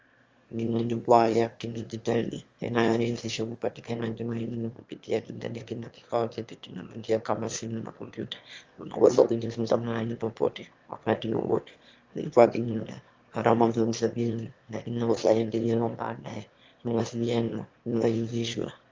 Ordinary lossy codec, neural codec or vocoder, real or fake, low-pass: Opus, 32 kbps; autoencoder, 22.05 kHz, a latent of 192 numbers a frame, VITS, trained on one speaker; fake; 7.2 kHz